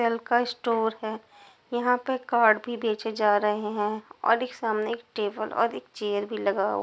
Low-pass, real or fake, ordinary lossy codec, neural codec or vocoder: none; real; none; none